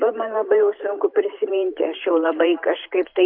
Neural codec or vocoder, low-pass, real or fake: vocoder, 44.1 kHz, 128 mel bands every 512 samples, BigVGAN v2; 5.4 kHz; fake